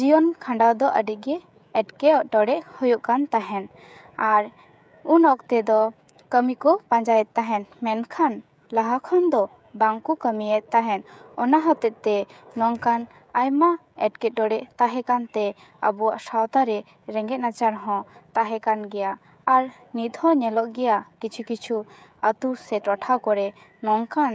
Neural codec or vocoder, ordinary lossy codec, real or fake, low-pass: codec, 16 kHz, 16 kbps, FreqCodec, smaller model; none; fake; none